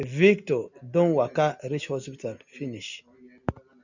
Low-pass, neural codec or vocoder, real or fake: 7.2 kHz; none; real